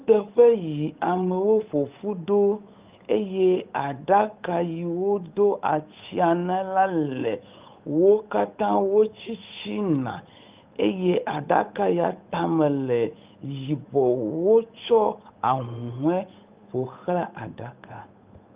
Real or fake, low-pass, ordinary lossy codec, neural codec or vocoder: fake; 3.6 kHz; Opus, 24 kbps; codec, 16 kHz, 8 kbps, FunCodec, trained on Chinese and English, 25 frames a second